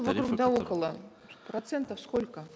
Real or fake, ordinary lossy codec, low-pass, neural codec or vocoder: real; none; none; none